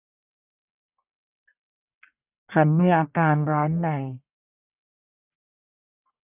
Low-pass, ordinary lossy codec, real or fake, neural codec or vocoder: 3.6 kHz; none; fake; codec, 44.1 kHz, 1.7 kbps, Pupu-Codec